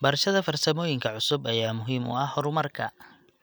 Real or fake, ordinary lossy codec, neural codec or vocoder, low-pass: real; none; none; none